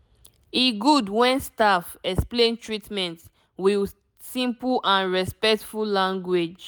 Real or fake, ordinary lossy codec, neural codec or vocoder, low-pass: real; none; none; none